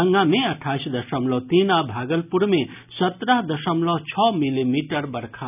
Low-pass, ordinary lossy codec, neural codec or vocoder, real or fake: 3.6 kHz; none; none; real